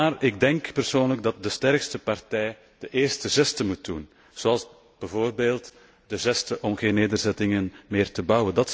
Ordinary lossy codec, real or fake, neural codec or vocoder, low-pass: none; real; none; none